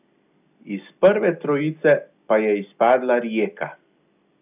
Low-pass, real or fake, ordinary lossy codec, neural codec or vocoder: 3.6 kHz; real; none; none